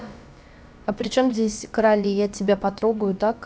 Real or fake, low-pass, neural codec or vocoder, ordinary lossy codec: fake; none; codec, 16 kHz, about 1 kbps, DyCAST, with the encoder's durations; none